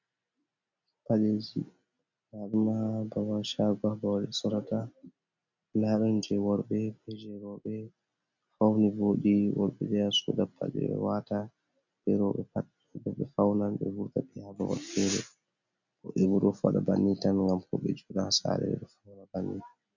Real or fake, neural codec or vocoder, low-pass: real; none; 7.2 kHz